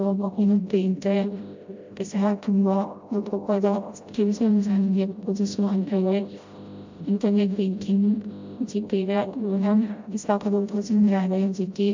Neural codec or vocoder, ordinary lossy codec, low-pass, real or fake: codec, 16 kHz, 0.5 kbps, FreqCodec, smaller model; MP3, 64 kbps; 7.2 kHz; fake